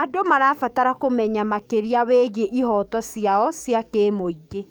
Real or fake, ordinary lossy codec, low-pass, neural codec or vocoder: fake; none; none; codec, 44.1 kHz, 7.8 kbps, DAC